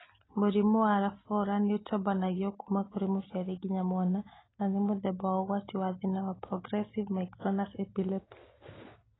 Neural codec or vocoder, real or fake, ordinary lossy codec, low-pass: none; real; AAC, 16 kbps; 7.2 kHz